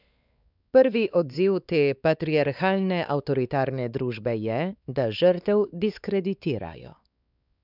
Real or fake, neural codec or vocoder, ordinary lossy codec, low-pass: fake; codec, 16 kHz, 4 kbps, X-Codec, WavLM features, trained on Multilingual LibriSpeech; none; 5.4 kHz